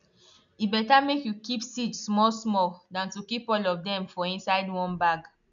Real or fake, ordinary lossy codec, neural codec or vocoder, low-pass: real; none; none; 7.2 kHz